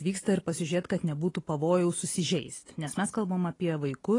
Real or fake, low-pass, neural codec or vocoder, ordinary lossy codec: real; 10.8 kHz; none; AAC, 32 kbps